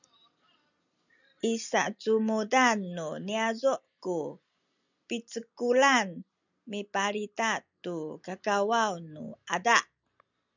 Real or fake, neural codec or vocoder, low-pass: real; none; 7.2 kHz